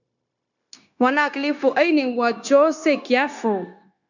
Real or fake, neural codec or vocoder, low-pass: fake; codec, 16 kHz, 0.9 kbps, LongCat-Audio-Codec; 7.2 kHz